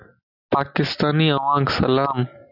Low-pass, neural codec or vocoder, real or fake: 5.4 kHz; none; real